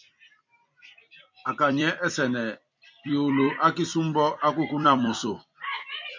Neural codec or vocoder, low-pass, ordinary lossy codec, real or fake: vocoder, 44.1 kHz, 128 mel bands every 256 samples, BigVGAN v2; 7.2 kHz; MP3, 64 kbps; fake